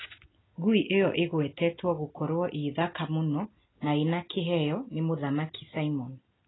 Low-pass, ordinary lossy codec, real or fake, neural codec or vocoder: 7.2 kHz; AAC, 16 kbps; real; none